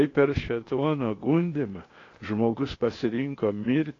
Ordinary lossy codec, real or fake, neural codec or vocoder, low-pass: AAC, 32 kbps; fake; codec, 16 kHz, 0.8 kbps, ZipCodec; 7.2 kHz